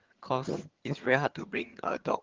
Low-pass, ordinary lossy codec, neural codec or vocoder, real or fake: 7.2 kHz; Opus, 16 kbps; vocoder, 22.05 kHz, 80 mel bands, HiFi-GAN; fake